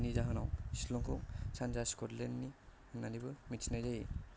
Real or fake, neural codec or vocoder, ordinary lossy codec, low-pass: real; none; none; none